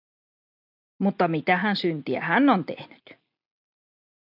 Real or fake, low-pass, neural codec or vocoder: real; 5.4 kHz; none